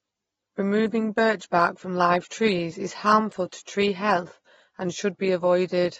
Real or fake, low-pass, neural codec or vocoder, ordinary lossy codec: real; 19.8 kHz; none; AAC, 24 kbps